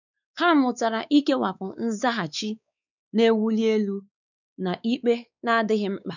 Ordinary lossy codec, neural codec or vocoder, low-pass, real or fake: none; codec, 16 kHz, 4 kbps, X-Codec, WavLM features, trained on Multilingual LibriSpeech; 7.2 kHz; fake